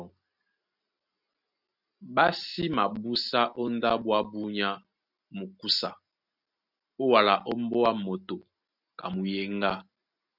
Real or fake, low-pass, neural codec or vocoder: real; 5.4 kHz; none